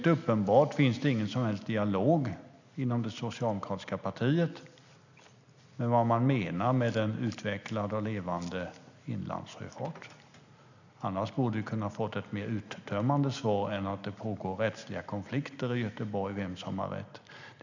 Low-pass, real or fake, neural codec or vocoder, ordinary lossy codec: 7.2 kHz; real; none; none